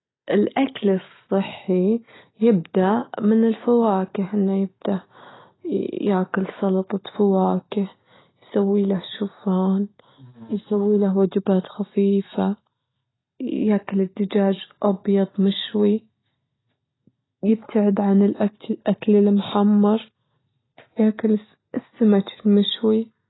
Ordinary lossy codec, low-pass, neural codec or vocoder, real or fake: AAC, 16 kbps; 7.2 kHz; none; real